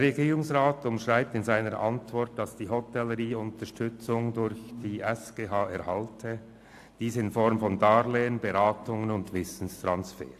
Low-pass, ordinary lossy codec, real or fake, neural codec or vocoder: 14.4 kHz; AAC, 64 kbps; real; none